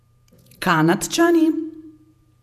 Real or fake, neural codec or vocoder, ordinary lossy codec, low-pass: fake; vocoder, 48 kHz, 128 mel bands, Vocos; none; 14.4 kHz